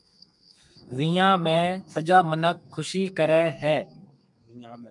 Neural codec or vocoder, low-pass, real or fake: codec, 32 kHz, 1.9 kbps, SNAC; 10.8 kHz; fake